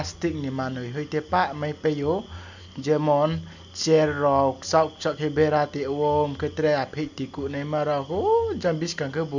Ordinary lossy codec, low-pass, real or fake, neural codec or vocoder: none; 7.2 kHz; real; none